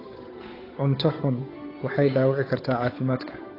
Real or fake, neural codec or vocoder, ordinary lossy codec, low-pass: fake; codec, 16 kHz, 8 kbps, FunCodec, trained on Chinese and English, 25 frames a second; AAC, 24 kbps; 5.4 kHz